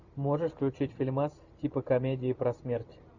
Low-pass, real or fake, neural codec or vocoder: 7.2 kHz; fake; vocoder, 44.1 kHz, 128 mel bands every 512 samples, BigVGAN v2